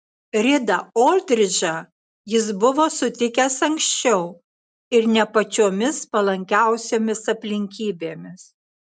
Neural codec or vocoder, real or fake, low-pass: none; real; 9.9 kHz